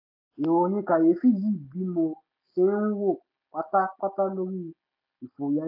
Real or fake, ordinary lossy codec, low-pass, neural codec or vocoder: real; AAC, 48 kbps; 5.4 kHz; none